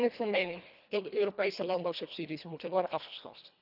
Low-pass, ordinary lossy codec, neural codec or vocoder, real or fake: 5.4 kHz; none; codec, 24 kHz, 1.5 kbps, HILCodec; fake